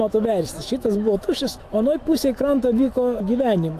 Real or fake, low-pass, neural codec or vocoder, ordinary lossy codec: fake; 14.4 kHz; autoencoder, 48 kHz, 128 numbers a frame, DAC-VAE, trained on Japanese speech; AAC, 64 kbps